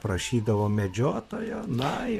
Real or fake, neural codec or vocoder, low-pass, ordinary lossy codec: fake; vocoder, 44.1 kHz, 128 mel bands, Pupu-Vocoder; 14.4 kHz; AAC, 96 kbps